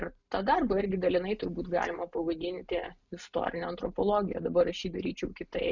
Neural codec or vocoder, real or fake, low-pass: none; real; 7.2 kHz